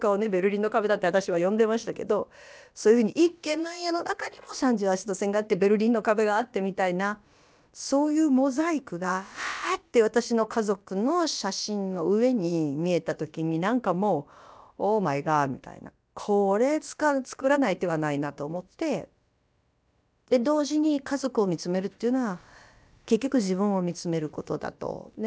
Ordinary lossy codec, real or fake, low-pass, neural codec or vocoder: none; fake; none; codec, 16 kHz, about 1 kbps, DyCAST, with the encoder's durations